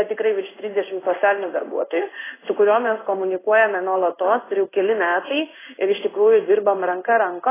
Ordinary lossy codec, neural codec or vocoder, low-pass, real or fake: AAC, 16 kbps; codec, 16 kHz in and 24 kHz out, 1 kbps, XY-Tokenizer; 3.6 kHz; fake